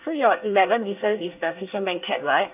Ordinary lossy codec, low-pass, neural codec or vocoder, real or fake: AAC, 32 kbps; 3.6 kHz; codec, 24 kHz, 1 kbps, SNAC; fake